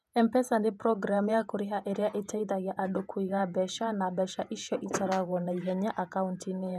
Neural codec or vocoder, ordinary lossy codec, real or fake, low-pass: vocoder, 48 kHz, 128 mel bands, Vocos; none; fake; 14.4 kHz